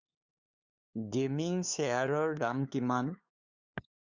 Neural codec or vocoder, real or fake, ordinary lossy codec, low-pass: codec, 16 kHz, 2 kbps, FunCodec, trained on LibriTTS, 25 frames a second; fake; Opus, 64 kbps; 7.2 kHz